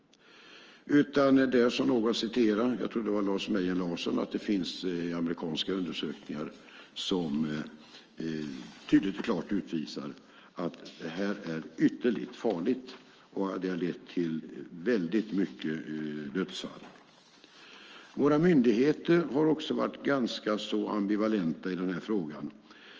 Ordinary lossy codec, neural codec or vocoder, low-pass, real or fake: Opus, 24 kbps; autoencoder, 48 kHz, 128 numbers a frame, DAC-VAE, trained on Japanese speech; 7.2 kHz; fake